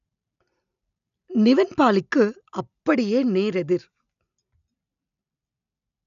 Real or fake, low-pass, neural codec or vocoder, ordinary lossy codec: real; 7.2 kHz; none; none